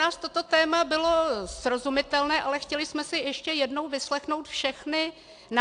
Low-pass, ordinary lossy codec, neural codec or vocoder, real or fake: 9.9 kHz; AAC, 64 kbps; none; real